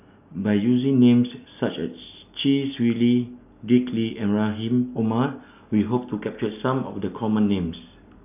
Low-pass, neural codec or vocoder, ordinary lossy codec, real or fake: 3.6 kHz; none; none; real